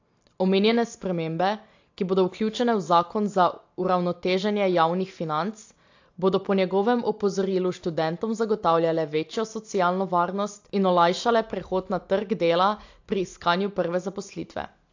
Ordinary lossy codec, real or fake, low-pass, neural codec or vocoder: AAC, 48 kbps; real; 7.2 kHz; none